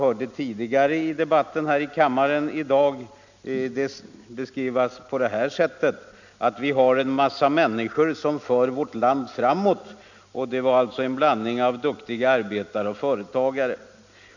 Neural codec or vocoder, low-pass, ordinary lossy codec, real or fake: none; 7.2 kHz; none; real